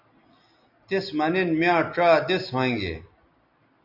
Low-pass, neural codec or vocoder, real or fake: 5.4 kHz; none; real